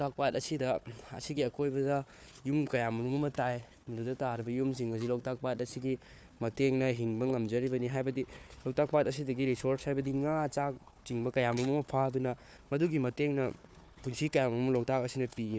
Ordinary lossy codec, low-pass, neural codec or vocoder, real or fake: none; none; codec, 16 kHz, 4 kbps, FunCodec, trained on Chinese and English, 50 frames a second; fake